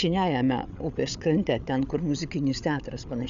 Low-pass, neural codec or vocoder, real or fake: 7.2 kHz; codec, 16 kHz, 8 kbps, FreqCodec, larger model; fake